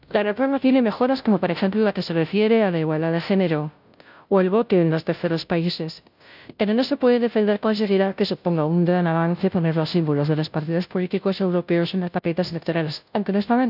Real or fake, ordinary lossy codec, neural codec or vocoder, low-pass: fake; none; codec, 16 kHz, 0.5 kbps, FunCodec, trained on Chinese and English, 25 frames a second; 5.4 kHz